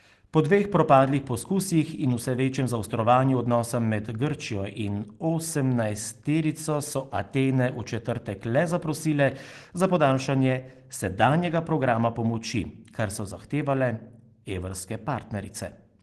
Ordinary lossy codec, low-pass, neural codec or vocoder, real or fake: Opus, 16 kbps; 10.8 kHz; none; real